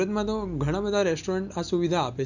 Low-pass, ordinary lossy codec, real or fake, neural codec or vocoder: 7.2 kHz; none; real; none